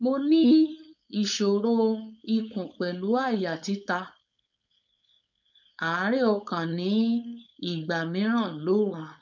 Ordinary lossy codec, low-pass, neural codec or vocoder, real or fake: none; 7.2 kHz; codec, 16 kHz, 4.8 kbps, FACodec; fake